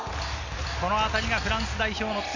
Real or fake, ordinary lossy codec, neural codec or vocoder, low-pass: real; none; none; 7.2 kHz